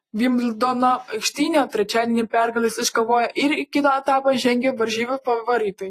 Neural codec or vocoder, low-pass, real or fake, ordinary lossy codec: vocoder, 48 kHz, 128 mel bands, Vocos; 14.4 kHz; fake; AAC, 48 kbps